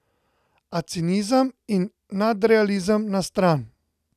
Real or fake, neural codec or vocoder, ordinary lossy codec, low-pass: real; none; none; 14.4 kHz